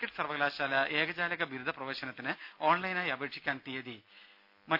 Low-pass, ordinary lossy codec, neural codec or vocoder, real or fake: 5.4 kHz; none; none; real